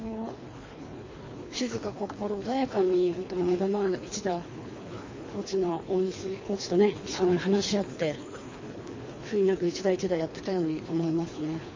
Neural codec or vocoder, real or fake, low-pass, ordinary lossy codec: codec, 24 kHz, 3 kbps, HILCodec; fake; 7.2 kHz; MP3, 32 kbps